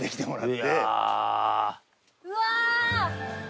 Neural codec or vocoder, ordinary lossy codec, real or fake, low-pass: none; none; real; none